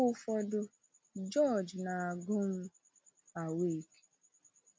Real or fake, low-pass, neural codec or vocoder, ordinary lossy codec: real; none; none; none